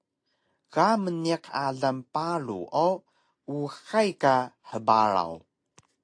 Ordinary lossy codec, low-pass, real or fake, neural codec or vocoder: AAC, 48 kbps; 9.9 kHz; real; none